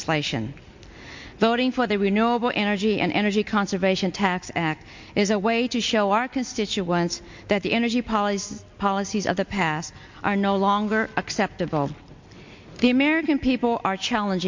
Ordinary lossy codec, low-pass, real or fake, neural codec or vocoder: MP3, 48 kbps; 7.2 kHz; real; none